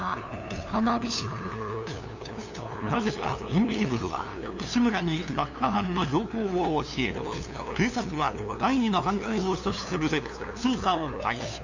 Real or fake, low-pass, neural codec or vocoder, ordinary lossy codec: fake; 7.2 kHz; codec, 16 kHz, 2 kbps, FunCodec, trained on LibriTTS, 25 frames a second; none